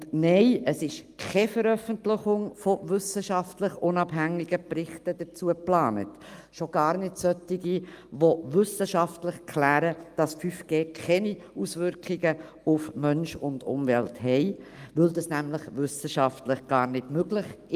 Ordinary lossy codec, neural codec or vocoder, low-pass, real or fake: Opus, 32 kbps; none; 14.4 kHz; real